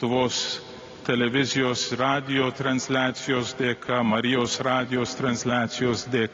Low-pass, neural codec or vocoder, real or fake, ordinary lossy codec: 7.2 kHz; none; real; AAC, 32 kbps